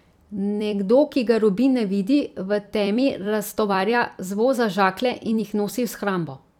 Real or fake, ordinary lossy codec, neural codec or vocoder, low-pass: fake; none; vocoder, 44.1 kHz, 128 mel bands every 256 samples, BigVGAN v2; 19.8 kHz